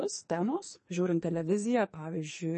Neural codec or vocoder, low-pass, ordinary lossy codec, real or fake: codec, 44.1 kHz, 2.6 kbps, SNAC; 10.8 kHz; MP3, 32 kbps; fake